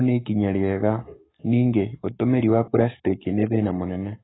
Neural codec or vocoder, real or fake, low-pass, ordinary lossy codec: codec, 44.1 kHz, 7.8 kbps, Pupu-Codec; fake; 7.2 kHz; AAC, 16 kbps